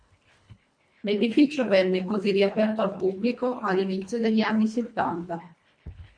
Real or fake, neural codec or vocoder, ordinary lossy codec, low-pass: fake; codec, 24 kHz, 1.5 kbps, HILCodec; MP3, 48 kbps; 9.9 kHz